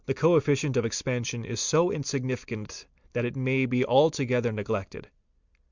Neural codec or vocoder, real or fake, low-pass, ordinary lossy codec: none; real; 7.2 kHz; Opus, 64 kbps